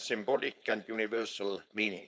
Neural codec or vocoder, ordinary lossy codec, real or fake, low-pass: codec, 16 kHz, 4.8 kbps, FACodec; none; fake; none